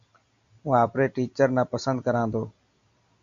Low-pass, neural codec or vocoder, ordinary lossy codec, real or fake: 7.2 kHz; none; AAC, 64 kbps; real